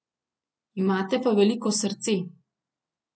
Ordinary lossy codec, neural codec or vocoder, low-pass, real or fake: none; none; none; real